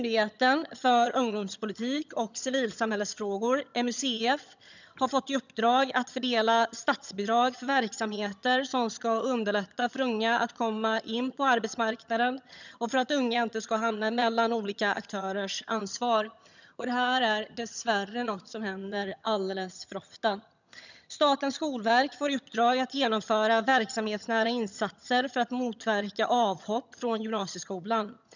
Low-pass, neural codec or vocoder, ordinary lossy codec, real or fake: 7.2 kHz; vocoder, 22.05 kHz, 80 mel bands, HiFi-GAN; none; fake